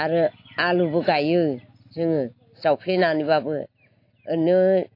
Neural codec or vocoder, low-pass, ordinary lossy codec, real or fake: none; 5.4 kHz; AAC, 32 kbps; real